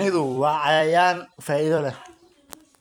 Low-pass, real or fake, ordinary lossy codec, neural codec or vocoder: 19.8 kHz; fake; none; vocoder, 44.1 kHz, 128 mel bands every 256 samples, BigVGAN v2